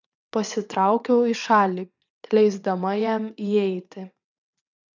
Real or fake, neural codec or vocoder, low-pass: fake; vocoder, 22.05 kHz, 80 mel bands, WaveNeXt; 7.2 kHz